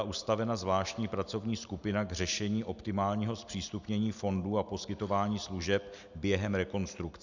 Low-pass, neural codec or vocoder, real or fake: 7.2 kHz; none; real